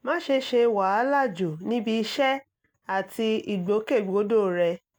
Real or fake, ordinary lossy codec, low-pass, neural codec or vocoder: real; none; none; none